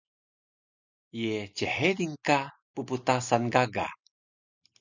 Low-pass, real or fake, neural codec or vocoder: 7.2 kHz; real; none